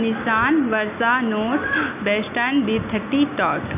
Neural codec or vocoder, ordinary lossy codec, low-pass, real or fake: none; none; 3.6 kHz; real